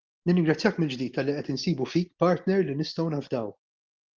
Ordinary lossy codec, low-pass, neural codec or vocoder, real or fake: Opus, 32 kbps; 7.2 kHz; none; real